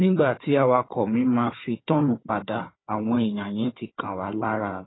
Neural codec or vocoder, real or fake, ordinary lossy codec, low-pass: codec, 16 kHz, 4 kbps, FunCodec, trained on Chinese and English, 50 frames a second; fake; AAC, 16 kbps; 7.2 kHz